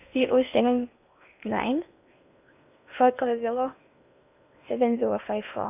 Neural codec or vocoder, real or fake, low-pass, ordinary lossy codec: codec, 16 kHz in and 24 kHz out, 0.8 kbps, FocalCodec, streaming, 65536 codes; fake; 3.6 kHz; none